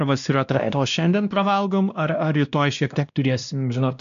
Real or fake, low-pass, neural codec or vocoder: fake; 7.2 kHz; codec, 16 kHz, 1 kbps, X-Codec, WavLM features, trained on Multilingual LibriSpeech